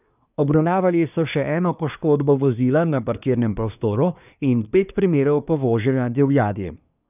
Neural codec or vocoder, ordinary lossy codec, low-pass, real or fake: codec, 24 kHz, 1 kbps, SNAC; none; 3.6 kHz; fake